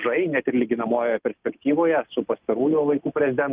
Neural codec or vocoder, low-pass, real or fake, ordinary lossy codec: none; 3.6 kHz; real; Opus, 24 kbps